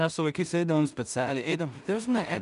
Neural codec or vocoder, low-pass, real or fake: codec, 16 kHz in and 24 kHz out, 0.4 kbps, LongCat-Audio-Codec, two codebook decoder; 10.8 kHz; fake